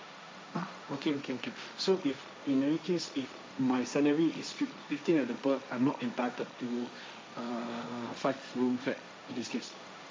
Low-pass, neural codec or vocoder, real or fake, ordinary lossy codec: none; codec, 16 kHz, 1.1 kbps, Voila-Tokenizer; fake; none